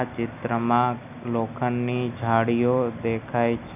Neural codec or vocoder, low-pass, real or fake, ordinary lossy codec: none; 3.6 kHz; real; none